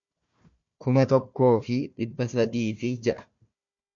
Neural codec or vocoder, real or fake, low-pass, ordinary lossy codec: codec, 16 kHz, 1 kbps, FunCodec, trained on Chinese and English, 50 frames a second; fake; 7.2 kHz; MP3, 48 kbps